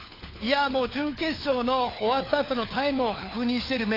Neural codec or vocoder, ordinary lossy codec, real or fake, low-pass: codec, 16 kHz, 4 kbps, FunCodec, trained on LibriTTS, 50 frames a second; AAC, 24 kbps; fake; 5.4 kHz